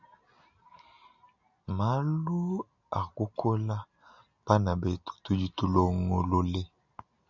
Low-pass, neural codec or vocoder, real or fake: 7.2 kHz; none; real